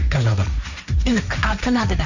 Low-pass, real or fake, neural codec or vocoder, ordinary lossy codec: 7.2 kHz; fake; codec, 16 kHz, 1.1 kbps, Voila-Tokenizer; none